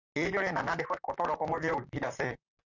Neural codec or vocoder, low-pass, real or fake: vocoder, 44.1 kHz, 128 mel bands, Pupu-Vocoder; 7.2 kHz; fake